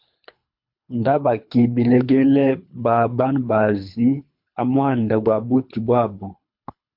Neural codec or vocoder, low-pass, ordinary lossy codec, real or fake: codec, 24 kHz, 3 kbps, HILCodec; 5.4 kHz; MP3, 48 kbps; fake